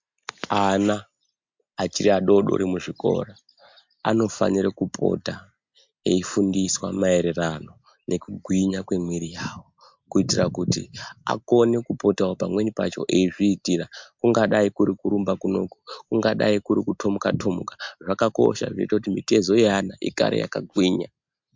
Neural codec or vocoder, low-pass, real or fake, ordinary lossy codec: none; 7.2 kHz; real; MP3, 64 kbps